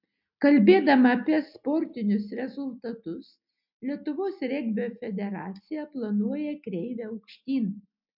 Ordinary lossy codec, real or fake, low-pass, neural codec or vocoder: MP3, 48 kbps; real; 5.4 kHz; none